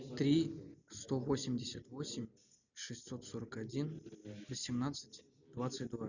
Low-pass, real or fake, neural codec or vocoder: 7.2 kHz; real; none